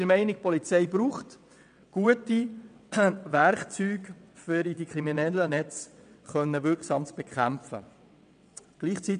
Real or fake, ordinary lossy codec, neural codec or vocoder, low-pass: fake; none; vocoder, 22.05 kHz, 80 mel bands, WaveNeXt; 9.9 kHz